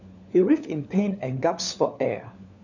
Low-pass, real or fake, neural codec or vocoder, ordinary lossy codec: 7.2 kHz; fake; codec, 16 kHz, 4 kbps, FunCodec, trained on LibriTTS, 50 frames a second; none